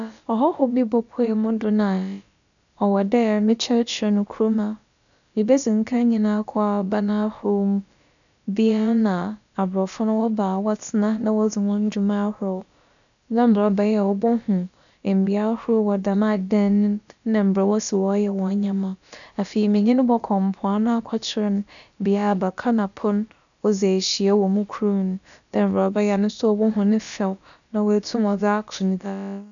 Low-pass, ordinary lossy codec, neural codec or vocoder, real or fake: 7.2 kHz; none; codec, 16 kHz, about 1 kbps, DyCAST, with the encoder's durations; fake